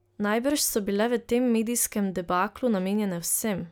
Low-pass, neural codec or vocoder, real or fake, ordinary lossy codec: none; none; real; none